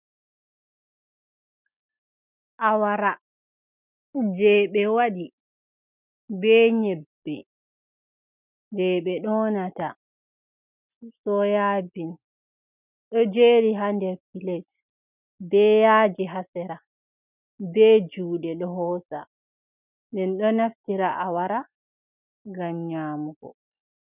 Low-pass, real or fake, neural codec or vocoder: 3.6 kHz; real; none